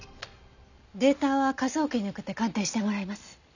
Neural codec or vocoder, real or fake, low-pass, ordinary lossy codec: none; real; 7.2 kHz; none